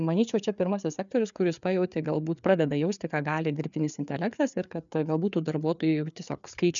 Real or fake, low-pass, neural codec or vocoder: fake; 7.2 kHz; codec, 16 kHz, 4 kbps, FreqCodec, larger model